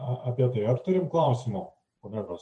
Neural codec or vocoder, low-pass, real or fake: vocoder, 44.1 kHz, 128 mel bands every 512 samples, BigVGAN v2; 10.8 kHz; fake